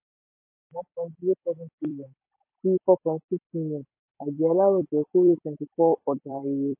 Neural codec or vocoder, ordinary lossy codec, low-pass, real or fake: vocoder, 44.1 kHz, 128 mel bands every 256 samples, BigVGAN v2; MP3, 24 kbps; 3.6 kHz; fake